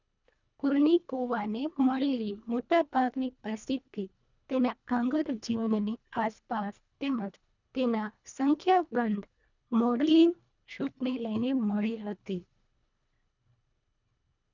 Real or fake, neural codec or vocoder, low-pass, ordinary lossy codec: fake; codec, 24 kHz, 1.5 kbps, HILCodec; 7.2 kHz; none